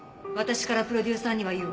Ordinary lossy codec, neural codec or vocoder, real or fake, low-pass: none; none; real; none